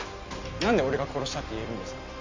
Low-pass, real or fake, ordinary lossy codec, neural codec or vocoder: 7.2 kHz; real; MP3, 48 kbps; none